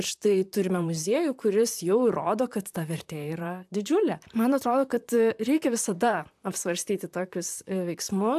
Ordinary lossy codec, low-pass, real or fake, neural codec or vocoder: AAC, 96 kbps; 14.4 kHz; fake; vocoder, 44.1 kHz, 128 mel bands, Pupu-Vocoder